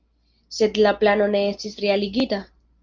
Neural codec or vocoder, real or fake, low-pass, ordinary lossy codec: none; real; 7.2 kHz; Opus, 24 kbps